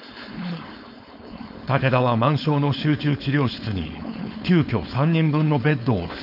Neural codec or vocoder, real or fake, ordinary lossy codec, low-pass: codec, 16 kHz, 4.8 kbps, FACodec; fake; none; 5.4 kHz